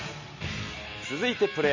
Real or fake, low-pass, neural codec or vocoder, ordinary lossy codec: real; 7.2 kHz; none; MP3, 32 kbps